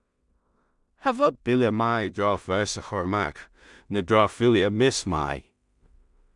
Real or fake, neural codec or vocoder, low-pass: fake; codec, 16 kHz in and 24 kHz out, 0.4 kbps, LongCat-Audio-Codec, two codebook decoder; 10.8 kHz